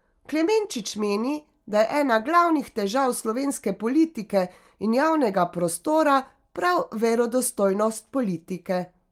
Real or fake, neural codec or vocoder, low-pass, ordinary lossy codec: real; none; 19.8 kHz; Opus, 32 kbps